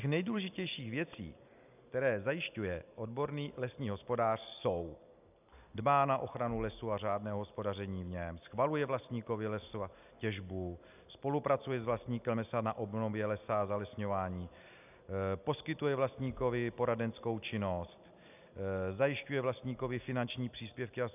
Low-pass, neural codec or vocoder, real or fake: 3.6 kHz; none; real